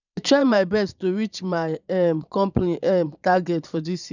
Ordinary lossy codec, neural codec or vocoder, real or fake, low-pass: none; vocoder, 22.05 kHz, 80 mel bands, Vocos; fake; 7.2 kHz